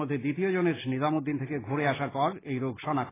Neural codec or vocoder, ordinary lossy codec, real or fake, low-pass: none; AAC, 16 kbps; real; 3.6 kHz